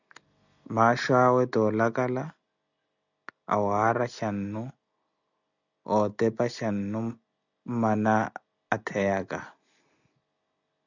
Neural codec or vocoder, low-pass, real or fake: none; 7.2 kHz; real